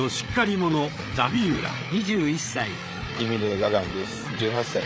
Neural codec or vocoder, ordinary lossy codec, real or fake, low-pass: codec, 16 kHz, 8 kbps, FreqCodec, larger model; none; fake; none